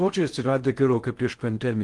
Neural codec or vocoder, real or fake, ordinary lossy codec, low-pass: codec, 16 kHz in and 24 kHz out, 0.6 kbps, FocalCodec, streaming, 4096 codes; fake; Opus, 24 kbps; 10.8 kHz